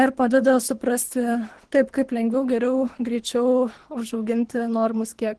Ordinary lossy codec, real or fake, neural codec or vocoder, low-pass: Opus, 16 kbps; fake; codec, 24 kHz, 3 kbps, HILCodec; 10.8 kHz